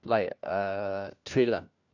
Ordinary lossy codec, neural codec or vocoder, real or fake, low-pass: none; codec, 16 kHz, 1 kbps, FunCodec, trained on LibriTTS, 50 frames a second; fake; 7.2 kHz